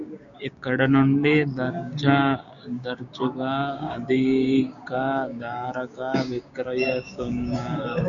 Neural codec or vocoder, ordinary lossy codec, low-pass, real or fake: codec, 16 kHz, 6 kbps, DAC; MP3, 96 kbps; 7.2 kHz; fake